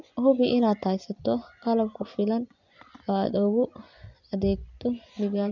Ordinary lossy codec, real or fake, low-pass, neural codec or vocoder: none; fake; 7.2 kHz; vocoder, 24 kHz, 100 mel bands, Vocos